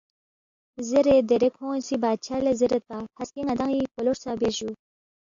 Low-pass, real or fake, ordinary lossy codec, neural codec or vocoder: 7.2 kHz; real; AAC, 64 kbps; none